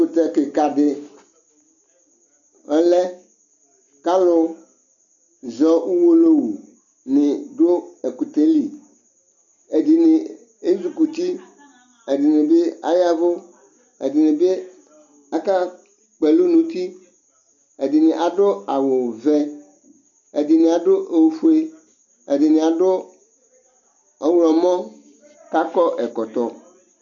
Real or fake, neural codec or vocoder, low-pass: real; none; 7.2 kHz